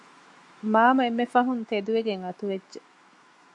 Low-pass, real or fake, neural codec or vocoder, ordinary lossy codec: 10.8 kHz; fake; autoencoder, 48 kHz, 128 numbers a frame, DAC-VAE, trained on Japanese speech; MP3, 48 kbps